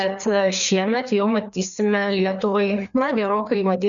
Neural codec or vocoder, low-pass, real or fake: codec, 16 kHz, 2 kbps, FreqCodec, larger model; 7.2 kHz; fake